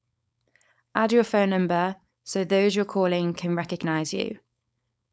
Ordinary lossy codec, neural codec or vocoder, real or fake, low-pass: none; codec, 16 kHz, 4.8 kbps, FACodec; fake; none